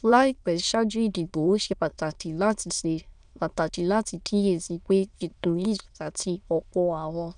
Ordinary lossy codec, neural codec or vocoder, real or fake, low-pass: none; autoencoder, 22.05 kHz, a latent of 192 numbers a frame, VITS, trained on many speakers; fake; 9.9 kHz